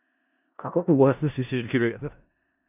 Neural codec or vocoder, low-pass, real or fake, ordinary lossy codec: codec, 16 kHz in and 24 kHz out, 0.4 kbps, LongCat-Audio-Codec, four codebook decoder; 3.6 kHz; fake; none